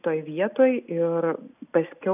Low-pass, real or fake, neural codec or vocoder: 3.6 kHz; real; none